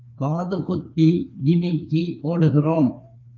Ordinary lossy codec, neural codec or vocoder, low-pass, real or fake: Opus, 24 kbps; codec, 16 kHz, 2 kbps, FreqCodec, larger model; 7.2 kHz; fake